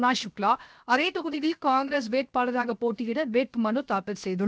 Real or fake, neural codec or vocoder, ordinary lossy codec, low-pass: fake; codec, 16 kHz, 0.7 kbps, FocalCodec; none; none